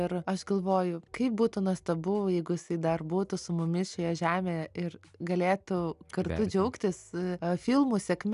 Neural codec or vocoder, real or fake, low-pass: none; real; 10.8 kHz